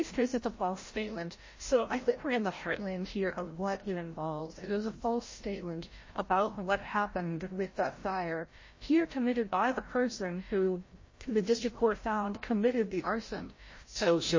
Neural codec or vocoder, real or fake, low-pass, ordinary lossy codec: codec, 16 kHz, 0.5 kbps, FreqCodec, larger model; fake; 7.2 kHz; MP3, 32 kbps